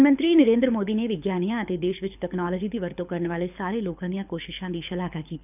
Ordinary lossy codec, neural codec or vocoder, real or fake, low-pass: none; codec, 24 kHz, 6 kbps, HILCodec; fake; 3.6 kHz